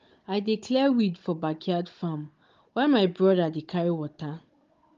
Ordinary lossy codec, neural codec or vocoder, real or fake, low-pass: Opus, 32 kbps; codec, 16 kHz, 16 kbps, FunCodec, trained on Chinese and English, 50 frames a second; fake; 7.2 kHz